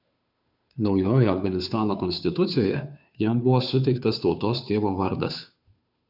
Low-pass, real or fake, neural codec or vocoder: 5.4 kHz; fake; codec, 16 kHz, 2 kbps, FunCodec, trained on Chinese and English, 25 frames a second